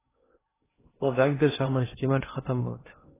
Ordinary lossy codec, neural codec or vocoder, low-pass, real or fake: AAC, 16 kbps; codec, 16 kHz in and 24 kHz out, 0.6 kbps, FocalCodec, streaming, 2048 codes; 3.6 kHz; fake